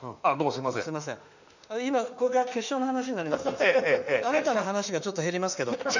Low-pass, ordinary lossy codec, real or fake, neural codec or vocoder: 7.2 kHz; none; fake; autoencoder, 48 kHz, 32 numbers a frame, DAC-VAE, trained on Japanese speech